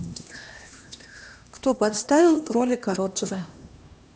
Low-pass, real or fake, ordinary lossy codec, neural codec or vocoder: none; fake; none; codec, 16 kHz, 1 kbps, X-Codec, HuBERT features, trained on LibriSpeech